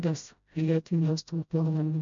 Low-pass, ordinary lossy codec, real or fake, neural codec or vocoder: 7.2 kHz; MP3, 64 kbps; fake; codec, 16 kHz, 0.5 kbps, FreqCodec, smaller model